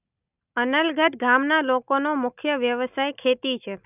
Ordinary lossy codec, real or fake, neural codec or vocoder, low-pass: none; real; none; 3.6 kHz